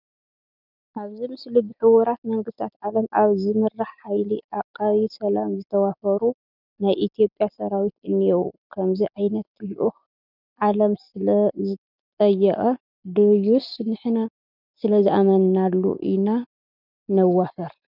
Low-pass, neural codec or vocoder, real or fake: 5.4 kHz; none; real